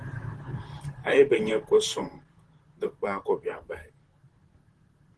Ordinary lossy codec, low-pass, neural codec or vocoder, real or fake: Opus, 16 kbps; 10.8 kHz; vocoder, 44.1 kHz, 128 mel bands, Pupu-Vocoder; fake